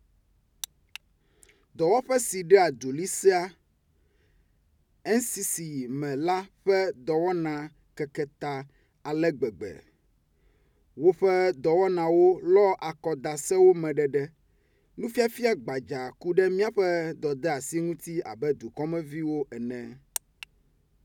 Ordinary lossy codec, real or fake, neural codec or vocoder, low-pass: none; real; none; 19.8 kHz